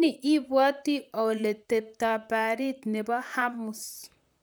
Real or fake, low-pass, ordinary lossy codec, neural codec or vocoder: fake; none; none; vocoder, 44.1 kHz, 128 mel bands, Pupu-Vocoder